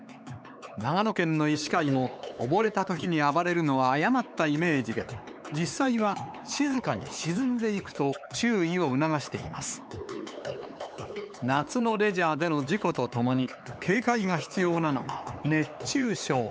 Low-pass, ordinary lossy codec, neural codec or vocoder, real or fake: none; none; codec, 16 kHz, 4 kbps, X-Codec, HuBERT features, trained on LibriSpeech; fake